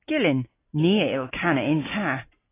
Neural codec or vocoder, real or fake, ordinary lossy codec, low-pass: none; real; AAC, 16 kbps; 3.6 kHz